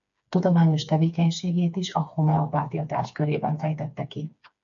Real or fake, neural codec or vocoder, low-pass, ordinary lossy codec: fake; codec, 16 kHz, 4 kbps, FreqCodec, smaller model; 7.2 kHz; MP3, 96 kbps